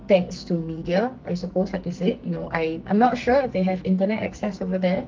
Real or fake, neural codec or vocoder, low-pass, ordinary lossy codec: fake; codec, 44.1 kHz, 2.6 kbps, SNAC; 7.2 kHz; Opus, 24 kbps